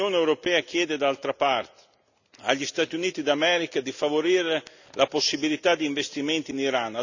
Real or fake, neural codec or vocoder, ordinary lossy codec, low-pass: real; none; none; 7.2 kHz